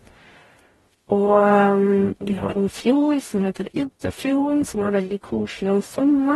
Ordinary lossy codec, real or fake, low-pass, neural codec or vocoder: AAC, 32 kbps; fake; 19.8 kHz; codec, 44.1 kHz, 0.9 kbps, DAC